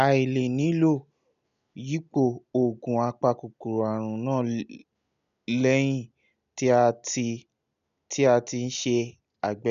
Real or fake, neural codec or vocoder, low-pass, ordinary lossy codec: real; none; 7.2 kHz; none